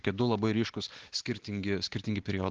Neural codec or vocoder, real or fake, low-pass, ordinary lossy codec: none; real; 7.2 kHz; Opus, 16 kbps